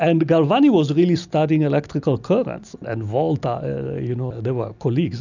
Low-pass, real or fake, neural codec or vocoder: 7.2 kHz; real; none